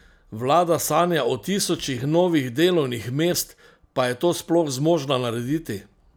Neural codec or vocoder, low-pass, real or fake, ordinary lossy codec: none; none; real; none